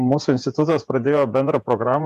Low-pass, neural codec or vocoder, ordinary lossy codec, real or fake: 14.4 kHz; vocoder, 48 kHz, 128 mel bands, Vocos; AAC, 96 kbps; fake